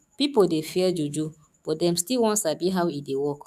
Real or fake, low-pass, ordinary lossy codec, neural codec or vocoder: fake; 14.4 kHz; none; autoencoder, 48 kHz, 128 numbers a frame, DAC-VAE, trained on Japanese speech